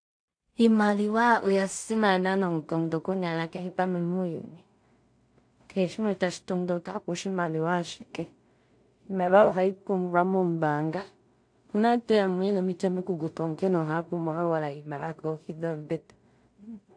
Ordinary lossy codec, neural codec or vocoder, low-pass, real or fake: AAC, 64 kbps; codec, 16 kHz in and 24 kHz out, 0.4 kbps, LongCat-Audio-Codec, two codebook decoder; 9.9 kHz; fake